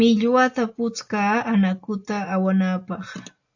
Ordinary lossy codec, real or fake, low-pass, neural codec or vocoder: AAC, 48 kbps; real; 7.2 kHz; none